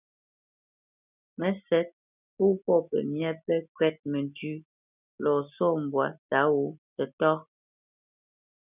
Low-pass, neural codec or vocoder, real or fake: 3.6 kHz; none; real